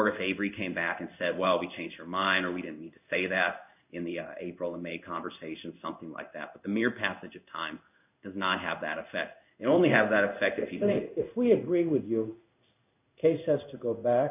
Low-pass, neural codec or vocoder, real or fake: 3.6 kHz; codec, 16 kHz in and 24 kHz out, 1 kbps, XY-Tokenizer; fake